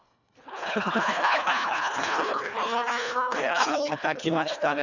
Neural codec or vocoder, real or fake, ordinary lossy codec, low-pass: codec, 24 kHz, 1.5 kbps, HILCodec; fake; none; 7.2 kHz